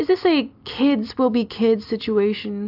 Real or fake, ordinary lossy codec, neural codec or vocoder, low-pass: real; AAC, 48 kbps; none; 5.4 kHz